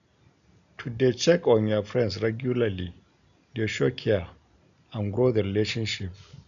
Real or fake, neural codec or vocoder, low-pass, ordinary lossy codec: real; none; 7.2 kHz; none